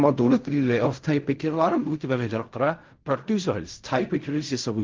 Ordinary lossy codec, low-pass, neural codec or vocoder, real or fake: Opus, 32 kbps; 7.2 kHz; codec, 16 kHz in and 24 kHz out, 0.4 kbps, LongCat-Audio-Codec, fine tuned four codebook decoder; fake